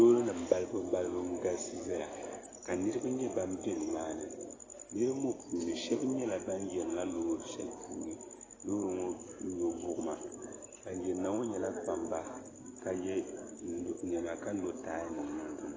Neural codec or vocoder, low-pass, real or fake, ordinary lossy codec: none; 7.2 kHz; real; AAC, 32 kbps